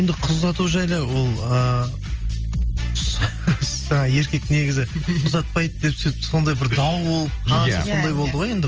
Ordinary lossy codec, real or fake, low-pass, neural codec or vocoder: Opus, 24 kbps; real; 7.2 kHz; none